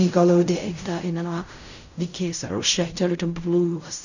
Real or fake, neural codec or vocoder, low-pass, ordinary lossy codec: fake; codec, 16 kHz in and 24 kHz out, 0.4 kbps, LongCat-Audio-Codec, fine tuned four codebook decoder; 7.2 kHz; none